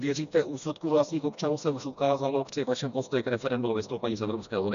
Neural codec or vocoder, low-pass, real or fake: codec, 16 kHz, 1 kbps, FreqCodec, smaller model; 7.2 kHz; fake